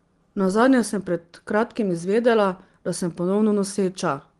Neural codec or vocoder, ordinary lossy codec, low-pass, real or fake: none; Opus, 24 kbps; 10.8 kHz; real